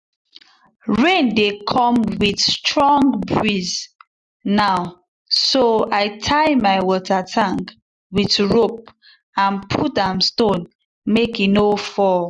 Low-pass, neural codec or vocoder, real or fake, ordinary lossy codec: 10.8 kHz; none; real; none